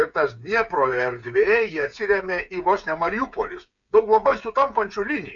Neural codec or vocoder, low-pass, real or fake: codec, 16 kHz, 8 kbps, FreqCodec, smaller model; 7.2 kHz; fake